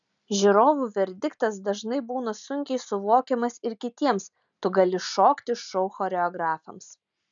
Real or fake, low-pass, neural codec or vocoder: real; 7.2 kHz; none